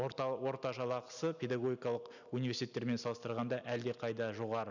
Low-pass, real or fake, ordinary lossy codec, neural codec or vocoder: 7.2 kHz; real; none; none